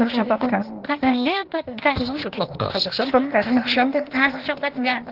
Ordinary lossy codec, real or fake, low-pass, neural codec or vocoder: Opus, 16 kbps; fake; 5.4 kHz; codec, 16 kHz, 0.8 kbps, ZipCodec